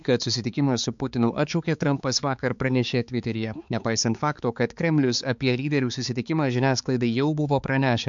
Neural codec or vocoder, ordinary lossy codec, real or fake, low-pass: codec, 16 kHz, 4 kbps, X-Codec, HuBERT features, trained on balanced general audio; MP3, 64 kbps; fake; 7.2 kHz